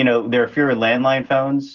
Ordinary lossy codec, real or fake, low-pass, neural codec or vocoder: Opus, 16 kbps; real; 7.2 kHz; none